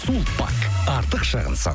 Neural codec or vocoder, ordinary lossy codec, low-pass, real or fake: none; none; none; real